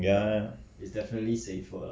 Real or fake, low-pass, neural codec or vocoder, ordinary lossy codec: real; none; none; none